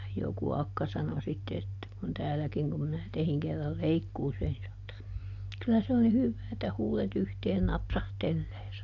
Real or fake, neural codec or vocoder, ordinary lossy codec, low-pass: real; none; AAC, 48 kbps; 7.2 kHz